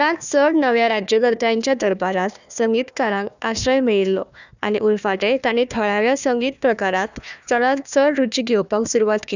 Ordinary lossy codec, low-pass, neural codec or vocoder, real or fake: none; 7.2 kHz; codec, 16 kHz, 1 kbps, FunCodec, trained on Chinese and English, 50 frames a second; fake